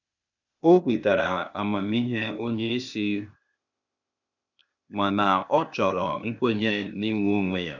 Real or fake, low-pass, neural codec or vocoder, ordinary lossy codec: fake; 7.2 kHz; codec, 16 kHz, 0.8 kbps, ZipCodec; none